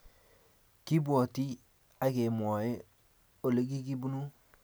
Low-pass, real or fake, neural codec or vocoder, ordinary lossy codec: none; real; none; none